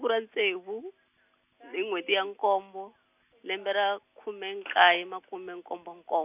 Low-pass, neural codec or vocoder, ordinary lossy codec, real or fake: 3.6 kHz; none; none; real